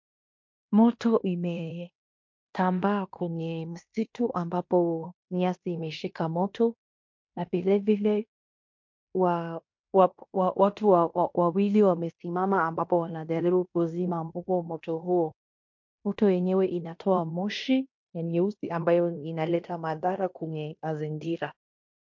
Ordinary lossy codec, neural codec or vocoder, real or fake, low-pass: MP3, 48 kbps; codec, 16 kHz in and 24 kHz out, 0.9 kbps, LongCat-Audio-Codec, fine tuned four codebook decoder; fake; 7.2 kHz